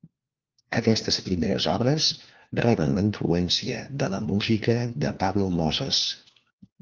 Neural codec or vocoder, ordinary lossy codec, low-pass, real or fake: codec, 16 kHz, 1 kbps, FunCodec, trained on LibriTTS, 50 frames a second; Opus, 32 kbps; 7.2 kHz; fake